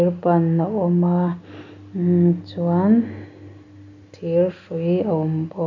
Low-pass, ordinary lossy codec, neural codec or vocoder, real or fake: 7.2 kHz; none; none; real